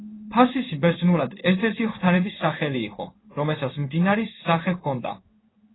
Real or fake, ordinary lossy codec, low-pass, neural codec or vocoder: fake; AAC, 16 kbps; 7.2 kHz; codec, 16 kHz in and 24 kHz out, 1 kbps, XY-Tokenizer